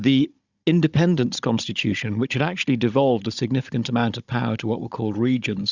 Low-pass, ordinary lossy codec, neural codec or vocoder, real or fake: 7.2 kHz; Opus, 64 kbps; codec, 16 kHz, 16 kbps, FunCodec, trained on Chinese and English, 50 frames a second; fake